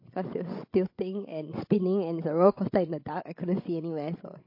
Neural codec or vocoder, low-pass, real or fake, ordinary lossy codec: codec, 16 kHz, 16 kbps, FreqCodec, larger model; 5.4 kHz; fake; MP3, 32 kbps